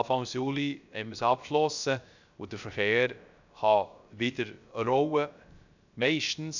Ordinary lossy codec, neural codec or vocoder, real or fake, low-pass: none; codec, 16 kHz, 0.3 kbps, FocalCodec; fake; 7.2 kHz